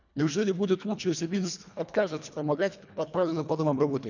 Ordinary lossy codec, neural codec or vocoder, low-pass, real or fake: none; codec, 24 kHz, 1.5 kbps, HILCodec; 7.2 kHz; fake